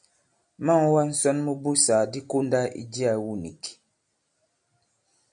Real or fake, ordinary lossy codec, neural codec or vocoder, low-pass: real; MP3, 64 kbps; none; 9.9 kHz